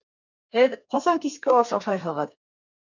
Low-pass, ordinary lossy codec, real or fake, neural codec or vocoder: 7.2 kHz; MP3, 64 kbps; fake; codec, 32 kHz, 1.9 kbps, SNAC